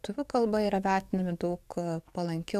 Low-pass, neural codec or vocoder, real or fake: 14.4 kHz; codec, 44.1 kHz, 7.8 kbps, DAC; fake